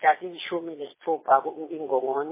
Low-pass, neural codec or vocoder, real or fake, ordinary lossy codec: 3.6 kHz; vocoder, 22.05 kHz, 80 mel bands, Vocos; fake; MP3, 16 kbps